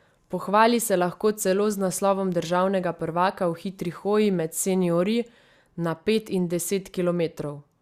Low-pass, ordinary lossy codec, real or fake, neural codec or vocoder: 14.4 kHz; Opus, 64 kbps; real; none